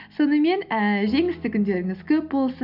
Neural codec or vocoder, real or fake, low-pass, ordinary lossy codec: none; real; 5.4 kHz; none